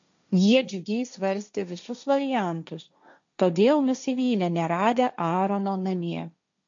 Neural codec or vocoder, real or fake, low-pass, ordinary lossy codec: codec, 16 kHz, 1.1 kbps, Voila-Tokenizer; fake; 7.2 kHz; AAC, 64 kbps